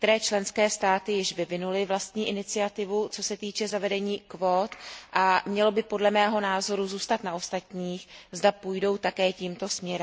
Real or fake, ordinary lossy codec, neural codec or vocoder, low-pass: real; none; none; none